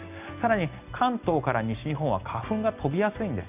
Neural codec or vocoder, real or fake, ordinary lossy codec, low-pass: none; real; none; 3.6 kHz